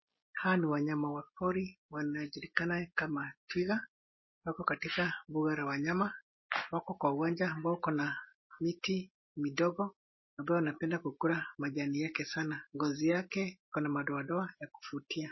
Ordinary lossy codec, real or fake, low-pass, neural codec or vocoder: MP3, 24 kbps; real; 7.2 kHz; none